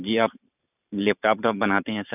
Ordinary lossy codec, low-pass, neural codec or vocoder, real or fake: none; 3.6 kHz; vocoder, 44.1 kHz, 128 mel bands every 512 samples, BigVGAN v2; fake